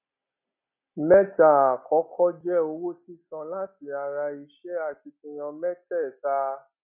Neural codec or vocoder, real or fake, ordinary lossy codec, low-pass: none; real; none; 3.6 kHz